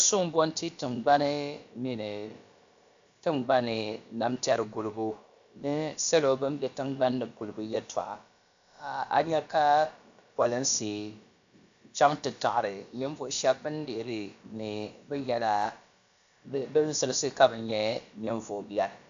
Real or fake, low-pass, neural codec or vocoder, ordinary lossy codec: fake; 7.2 kHz; codec, 16 kHz, about 1 kbps, DyCAST, with the encoder's durations; AAC, 64 kbps